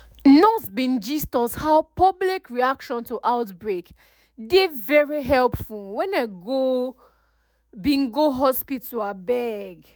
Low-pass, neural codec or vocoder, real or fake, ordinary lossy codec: none; autoencoder, 48 kHz, 128 numbers a frame, DAC-VAE, trained on Japanese speech; fake; none